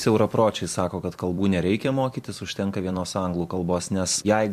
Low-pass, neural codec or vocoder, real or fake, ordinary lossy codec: 14.4 kHz; vocoder, 44.1 kHz, 128 mel bands every 256 samples, BigVGAN v2; fake; MP3, 64 kbps